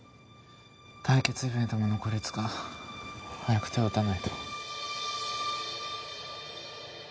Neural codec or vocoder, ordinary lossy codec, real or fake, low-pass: none; none; real; none